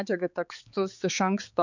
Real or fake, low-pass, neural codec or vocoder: fake; 7.2 kHz; codec, 16 kHz, 4 kbps, X-Codec, HuBERT features, trained on balanced general audio